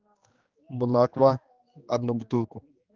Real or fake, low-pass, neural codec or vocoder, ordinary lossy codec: fake; 7.2 kHz; codec, 16 kHz, 2 kbps, X-Codec, HuBERT features, trained on general audio; Opus, 32 kbps